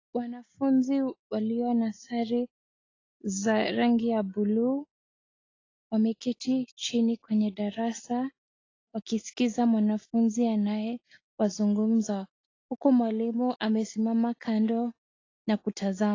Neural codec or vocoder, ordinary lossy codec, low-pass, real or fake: none; AAC, 32 kbps; 7.2 kHz; real